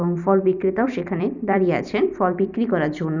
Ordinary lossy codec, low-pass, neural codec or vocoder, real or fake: none; 7.2 kHz; vocoder, 44.1 kHz, 128 mel bands every 256 samples, BigVGAN v2; fake